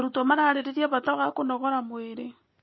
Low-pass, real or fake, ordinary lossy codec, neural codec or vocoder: 7.2 kHz; real; MP3, 24 kbps; none